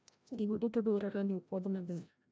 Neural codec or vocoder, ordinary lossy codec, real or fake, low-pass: codec, 16 kHz, 0.5 kbps, FreqCodec, larger model; none; fake; none